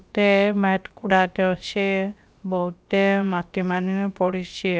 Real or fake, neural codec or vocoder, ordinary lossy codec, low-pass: fake; codec, 16 kHz, about 1 kbps, DyCAST, with the encoder's durations; none; none